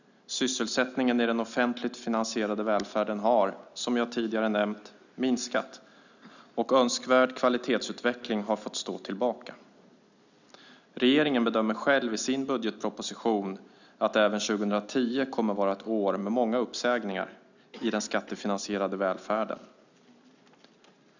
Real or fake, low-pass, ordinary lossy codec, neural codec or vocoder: real; 7.2 kHz; none; none